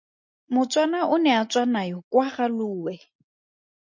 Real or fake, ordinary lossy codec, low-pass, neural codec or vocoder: real; MP3, 48 kbps; 7.2 kHz; none